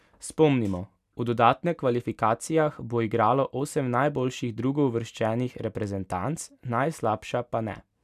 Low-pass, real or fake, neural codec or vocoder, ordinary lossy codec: 14.4 kHz; real; none; none